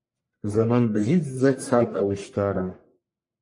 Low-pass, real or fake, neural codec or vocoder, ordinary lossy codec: 10.8 kHz; fake; codec, 44.1 kHz, 1.7 kbps, Pupu-Codec; MP3, 48 kbps